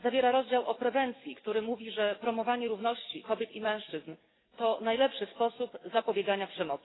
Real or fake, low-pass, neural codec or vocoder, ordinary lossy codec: fake; 7.2 kHz; codec, 44.1 kHz, 7.8 kbps, DAC; AAC, 16 kbps